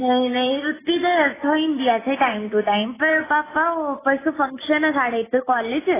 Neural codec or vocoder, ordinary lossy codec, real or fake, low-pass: none; AAC, 16 kbps; real; 3.6 kHz